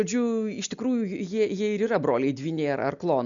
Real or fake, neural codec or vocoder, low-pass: real; none; 7.2 kHz